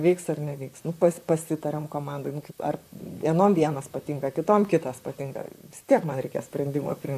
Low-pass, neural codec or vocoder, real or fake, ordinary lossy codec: 14.4 kHz; vocoder, 44.1 kHz, 128 mel bands, Pupu-Vocoder; fake; AAC, 96 kbps